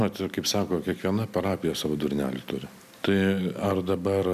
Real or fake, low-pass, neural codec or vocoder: real; 14.4 kHz; none